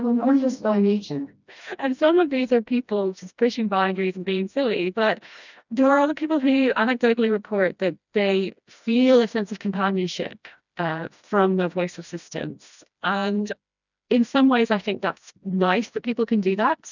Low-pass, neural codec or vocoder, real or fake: 7.2 kHz; codec, 16 kHz, 1 kbps, FreqCodec, smaller model; fake